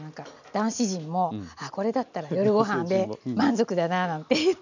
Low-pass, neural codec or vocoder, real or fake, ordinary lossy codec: 7.2 kHz; none; real; none